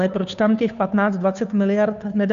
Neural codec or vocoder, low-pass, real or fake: codec, 16 kHz, 2 kbps, FunCodec, trained on Chinese and English, 25 frames a second; 7.2 kHz; fake